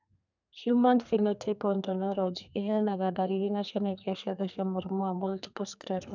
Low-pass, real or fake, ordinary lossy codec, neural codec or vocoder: 7.2 kHz; fake; none; codec, 32 kHz, 1.9 kbps, SNAC